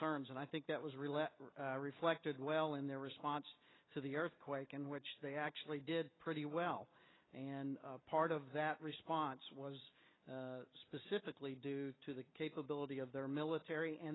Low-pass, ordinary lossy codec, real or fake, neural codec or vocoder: 7.2 kHz; AAC, 16 kbps; real; none